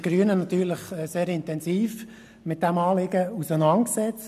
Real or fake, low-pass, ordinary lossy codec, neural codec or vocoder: real; 14.4 kHz; none; none